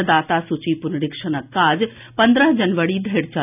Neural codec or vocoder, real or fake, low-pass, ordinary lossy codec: none; real; 3.6 kHz; none